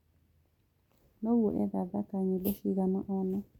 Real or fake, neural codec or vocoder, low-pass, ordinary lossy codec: real; none; 19.8 kHz; none